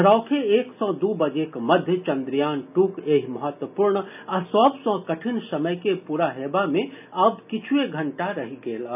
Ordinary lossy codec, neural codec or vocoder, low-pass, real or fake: none; none; 3.6 kHz; real